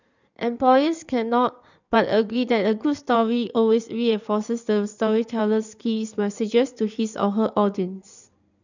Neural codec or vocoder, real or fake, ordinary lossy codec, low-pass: codec, 16 kHz in and 24 kHz out, 2.2 kbps, FireRedTTS-2 codec; fake; none; 7.2 kHz